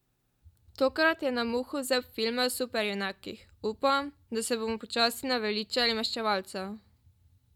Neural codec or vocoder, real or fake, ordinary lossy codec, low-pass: none; real; none; 19.8 kHz